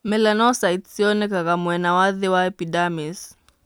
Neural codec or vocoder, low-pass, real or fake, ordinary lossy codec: none; none; real; none